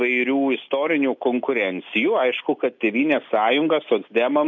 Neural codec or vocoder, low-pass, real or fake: none; 7.2 kHz; real